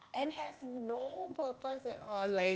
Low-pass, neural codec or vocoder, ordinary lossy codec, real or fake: none; codec, 16 kHz, 0.8 kbps, ZipCodec; none; fake